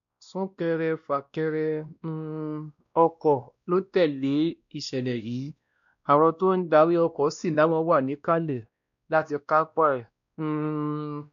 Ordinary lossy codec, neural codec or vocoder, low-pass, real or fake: none; codec, 16 kHz, 1 kbps, X-Codec, WavLM features, trained on Multilingual LibriSpeech; 7.2 kHz; fake